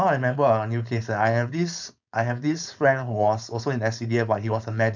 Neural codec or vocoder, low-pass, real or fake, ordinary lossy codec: codec, 16 kHz, 4.8 kbps, FACodec; 7.2 kHz; fake; none